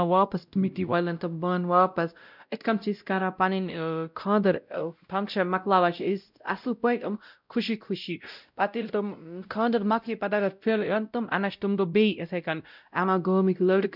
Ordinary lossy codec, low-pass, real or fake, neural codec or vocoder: none; 5.4 kHz; fake; codec, 16 kHz, 0.5 kbps, X-Codec, WavLM features, trained on Multilingual LibriSpeech